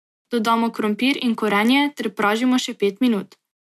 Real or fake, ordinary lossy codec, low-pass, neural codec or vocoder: real; MP3, 96 kbps; 14.4 kHz; none